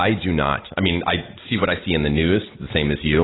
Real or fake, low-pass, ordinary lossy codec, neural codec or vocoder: real; 7.2 kHz; AAC, 16 kbps; none